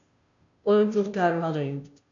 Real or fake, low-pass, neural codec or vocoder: fake; 7.2 kHz; codec, 16 kHz, 0.5 kbps, FunCodec, trained on Chinese and English, 25 frames a second